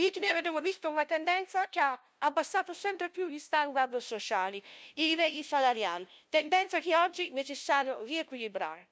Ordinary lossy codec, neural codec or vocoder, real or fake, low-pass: none; codec, 16 kHz, 0.5 kbps, FunCodec, trained on LibriTTS, 25 frames a second; fake; none